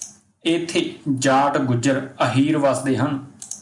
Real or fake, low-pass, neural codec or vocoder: real; 10.8 kHz; none